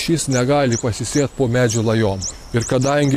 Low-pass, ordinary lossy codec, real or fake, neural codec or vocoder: 14.4 kHz; AAC, 64 kbps; fake; vocoder, 44.1 kHz, 128 mel bands every 512 samples, BigVGAN v2